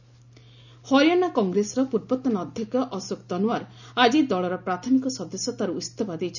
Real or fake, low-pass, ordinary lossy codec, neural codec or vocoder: real; 7.2 kHz; none; none